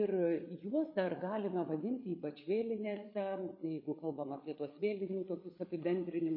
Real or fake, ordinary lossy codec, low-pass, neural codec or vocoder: fake; MP3, 24 kbps; 5.4 kHz; codec, 16 kHz, 8 kbps, FreqCodec, larger model